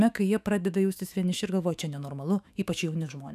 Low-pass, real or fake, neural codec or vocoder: 14.4 kHz; fake; autoencoder, 48 kHz, 128 numbers a frame, DAC-VAE, trained on Japanese speech